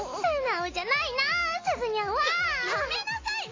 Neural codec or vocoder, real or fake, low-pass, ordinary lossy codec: none; real; 7.2 kHz; AAC, 32 kbps